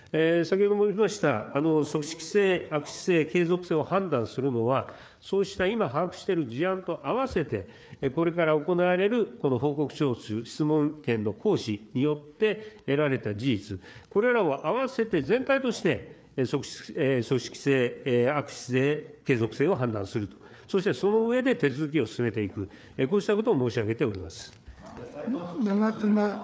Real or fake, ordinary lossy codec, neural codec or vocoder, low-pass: fake; none; codec, 16 kHz, 4 kbps, FreqCodec, larger model; none